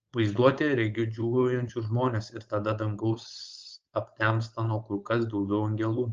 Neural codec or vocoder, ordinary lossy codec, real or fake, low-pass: codec, 16 kHz, 4.8 kbps, FACodec; Opus, 24 kbps; fake; 7.2 kHz